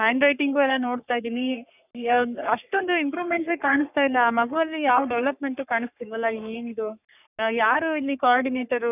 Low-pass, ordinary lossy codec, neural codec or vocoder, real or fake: 3.6 kHz; none; codec, 44.1 kHz, 3.4 kbps, Pupu-Codec; fake